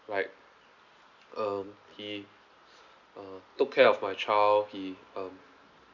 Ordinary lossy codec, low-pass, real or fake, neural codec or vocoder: none; 7.2 kHz; real; none